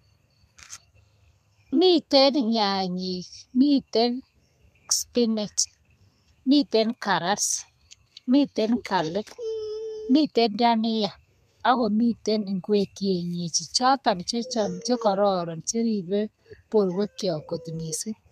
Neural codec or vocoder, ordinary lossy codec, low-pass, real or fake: codec, 32 kHz, 1.9 kbps, SNAC; MP3, 96 kbps; 14.4 kHz; fake